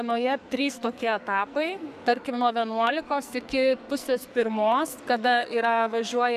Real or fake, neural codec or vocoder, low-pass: fake; codec, 44.1 kHz, 2.6 kbps, SNAC; 14.4 kHz